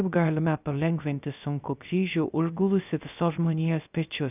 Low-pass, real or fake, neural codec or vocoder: 3.6 kHz; fake; codec, 16 kHz, 0.3 kbps, FocalCodec